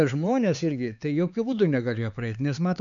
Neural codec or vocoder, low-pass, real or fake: codec, 16 kHz, 4 kbps, X-Codec, HuBERT features, trained on LibriSpeech; 7.2 kHz; fake